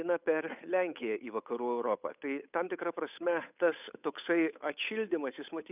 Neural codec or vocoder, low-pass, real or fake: codec, 16 kHz, 8 kbps, FunCodec, trained on Chinese and English, 25 frames a second; 3.6 kHz; fake